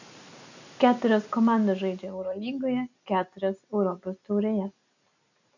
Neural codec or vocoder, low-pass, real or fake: none; 7.2 kHz; real